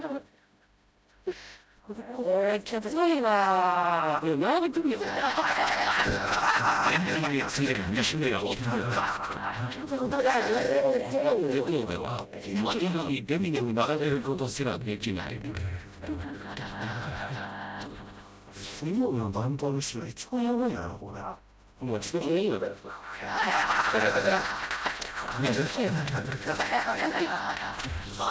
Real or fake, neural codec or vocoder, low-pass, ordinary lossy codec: fake; codec, 16 kHz, 0.5 kbps, FreqCodec, smaller model; none; none